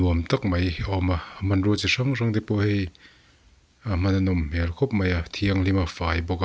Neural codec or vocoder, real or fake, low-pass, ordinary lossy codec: none; real; none; none